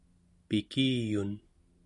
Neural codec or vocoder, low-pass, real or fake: none; 10.8 kHz; real